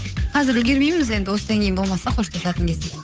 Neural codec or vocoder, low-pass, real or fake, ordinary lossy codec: codec, 16 kHz, 8 kbps, FunCodec, trained on Chinese and English, 25 frames a second; none; fake; none